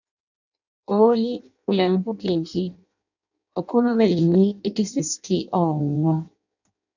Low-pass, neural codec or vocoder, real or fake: 7.2 kHz; codec, 16 kHz in and 24 kHz out, 0.6 kbps, FireRedTTS-2 codec; fake